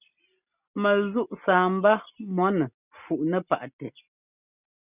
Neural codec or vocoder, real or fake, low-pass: none; real; 3.6 kHz